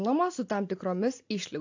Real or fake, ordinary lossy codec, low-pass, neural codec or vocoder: real; AAC, 48 kbps; 7.2 kHz; none